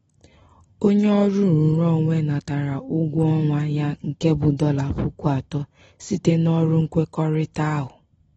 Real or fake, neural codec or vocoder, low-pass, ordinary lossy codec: real; none; 10.8 kHz; AAC, 24 kbps